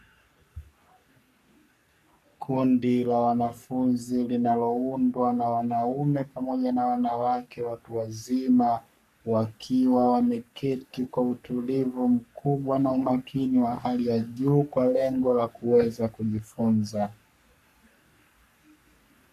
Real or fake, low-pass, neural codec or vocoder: fake; 14.4 kHz; codec, 44.1 kHz, 3.4 kbps, Pupu-Codec